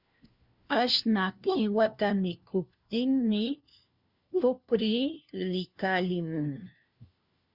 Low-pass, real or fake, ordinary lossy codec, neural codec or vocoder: 5.4 kHz; fake; Opus, 64 kbps; codec, 16 kHz, 1 kbps, FunCodec, trained on LibriTTS, 50 frames a second